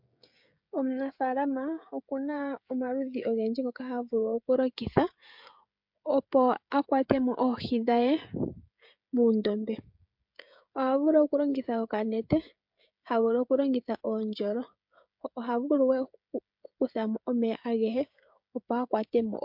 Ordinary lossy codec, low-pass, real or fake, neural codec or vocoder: MP3, 48 kbps; 5.4 kHz; fake; codec, 16 kHz, 16 kbps, FreqCodec, smaller model